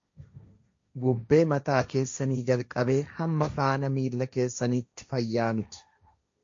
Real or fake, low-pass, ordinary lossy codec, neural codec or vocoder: fake; 7.2 kHz; MP3, 48 kbps; codec, 16 kHz, 1.1 kbps, Voila-Tokenizer